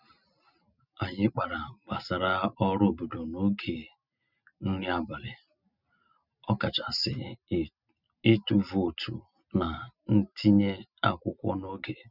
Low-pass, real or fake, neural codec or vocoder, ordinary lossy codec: 5.4 kHz; real; none; none